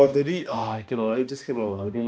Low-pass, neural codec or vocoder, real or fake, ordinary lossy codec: none; codec, 16 kHz, 1 kbps, X-Codec, HuBERT features, trained on balanced general audio; fake; none